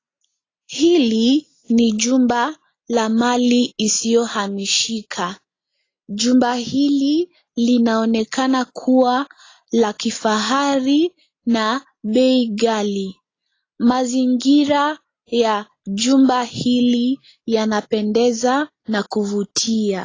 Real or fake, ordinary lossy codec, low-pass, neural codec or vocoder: real; AAC, 32 kbps; 7.2 kHz; none